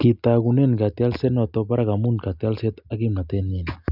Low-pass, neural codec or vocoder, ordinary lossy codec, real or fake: 5.4 kHz; none; none; real